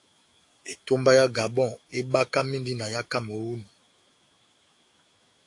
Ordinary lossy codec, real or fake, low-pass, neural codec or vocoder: AAC, 48 kbps; fake; 10.8 kHz; autoencoder, 48 kHz, 128 numbers a frame, DAC-VAE, trained on Japanese speech